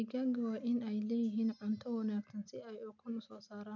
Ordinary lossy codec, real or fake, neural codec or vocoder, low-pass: none; real; none; 7.2 kHz